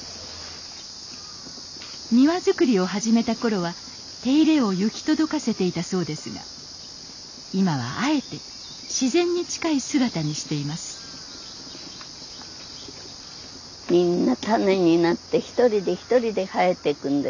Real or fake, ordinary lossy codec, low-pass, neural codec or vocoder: real; none; 7.2 kHz; none